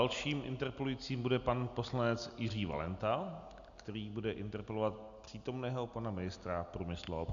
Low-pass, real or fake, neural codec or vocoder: 7.2 kHz; real; none